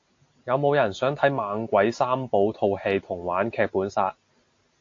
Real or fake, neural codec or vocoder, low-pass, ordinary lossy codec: real; none; 7.2 kHz; AAC, 48 kbps